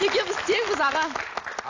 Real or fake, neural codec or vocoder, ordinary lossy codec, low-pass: real; none; AAC, 48 kbps; 7.2 kHz